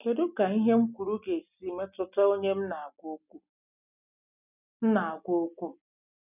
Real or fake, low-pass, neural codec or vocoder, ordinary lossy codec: real; 3.6 kHz; none; none